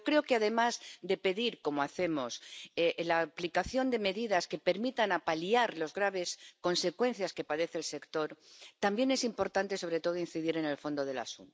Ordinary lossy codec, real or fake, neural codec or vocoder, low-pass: none; real; none; none